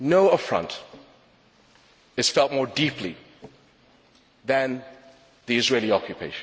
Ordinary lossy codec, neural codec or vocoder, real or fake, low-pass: none; none; real; none